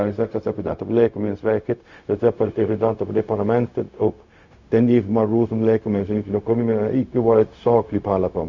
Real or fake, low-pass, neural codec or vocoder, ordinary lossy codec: fake; 7.2 kHz; codec, 16 kHz, 0.4 kbps, LongCat-Audio-Codec; Opus, 64 kbps